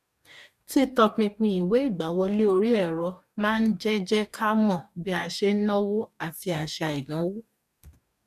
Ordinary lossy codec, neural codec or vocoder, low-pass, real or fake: none; codec, 44.1 kHz, 2.6 kbps, DAC; 14.4 kHz; fake